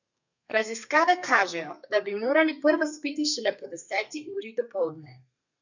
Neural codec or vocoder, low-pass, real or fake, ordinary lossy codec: codec, 32 kHz, 1.9 kbps, SNAC; 7.2 kHz; fake; none